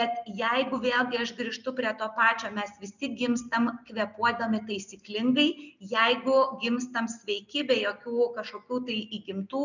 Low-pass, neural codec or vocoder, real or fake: 7.2 kHz; none; real